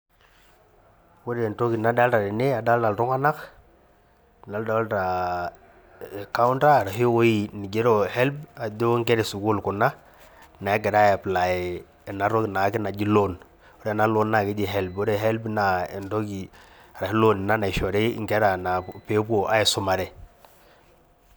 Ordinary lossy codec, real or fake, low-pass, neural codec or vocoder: none; real; none; none